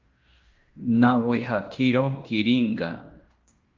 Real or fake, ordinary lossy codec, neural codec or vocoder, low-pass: fake; Opus, 24 kbps; codec, 16 kHz in and 24 kHz out, 0.9 kbps, LongCat-Audio-Codec, fine tuned four codebook decoder; 7.2 kHz